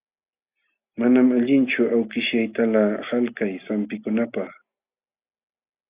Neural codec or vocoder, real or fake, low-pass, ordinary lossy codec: none; real; 3.6 kHz; Opus, 64 kbps